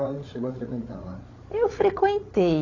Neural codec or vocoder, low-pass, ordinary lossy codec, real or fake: codec, 16 kHz, 16 kbps, FreqCodec, larger model; 7.2 kHz; AAC, 32 kbps; fake